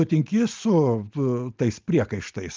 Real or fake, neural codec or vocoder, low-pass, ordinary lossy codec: real; none; 7.2 kHz; Opus, 32 kbps